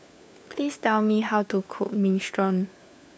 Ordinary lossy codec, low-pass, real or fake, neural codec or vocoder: none; none; fake; codec, 16 kHz, 4 kbps, FunCodec, trained on LibriTTS, 50 frames a second